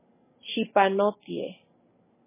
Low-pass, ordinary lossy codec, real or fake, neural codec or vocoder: 3.6 kHz; MP3, 16 kbps; real; none